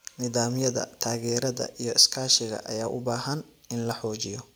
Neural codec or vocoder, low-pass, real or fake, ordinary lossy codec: none; none; real; none